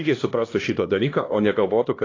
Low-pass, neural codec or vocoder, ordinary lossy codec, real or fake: 7.2 kHz; codec, 16 kHz, 1 kbps, X-Codec, HuBERT features, trained on LibriSpeech; AAC, 32 kbps; fake